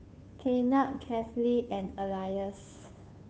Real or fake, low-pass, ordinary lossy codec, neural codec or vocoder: fake; none; none; codec, 16 kHz, 2 kbps, FunCodec, trained on Chinese and English, 25 frames a second